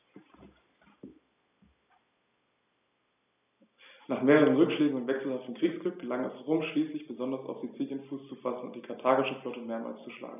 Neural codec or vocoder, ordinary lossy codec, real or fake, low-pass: none; none; real; 3.6 kHz